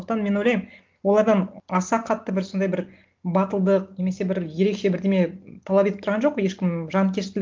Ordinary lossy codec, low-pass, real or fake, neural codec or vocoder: Opus, 32 kbps; 7.2 kHz; real; none